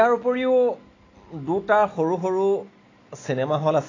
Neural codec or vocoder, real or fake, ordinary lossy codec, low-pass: none; real; AAC, 32 kbps; 7.2 kHz